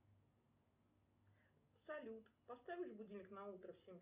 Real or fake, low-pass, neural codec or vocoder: real; 3.6 kHz; none